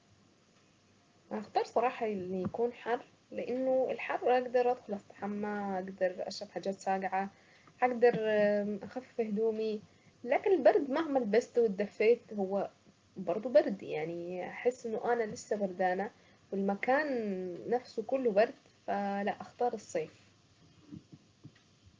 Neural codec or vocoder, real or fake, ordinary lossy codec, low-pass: none; real; Opus, 24 kbps; 7.2 kHz